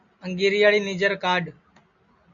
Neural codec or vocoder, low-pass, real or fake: none; 7.2 kHz; real